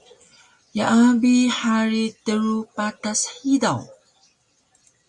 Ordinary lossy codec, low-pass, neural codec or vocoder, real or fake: Opus, 64 kbps; 10.8 kHz; none; real